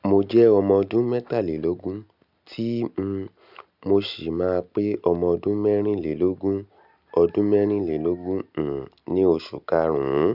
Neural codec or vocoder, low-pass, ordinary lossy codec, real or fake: none; 5.4 kHz; none; real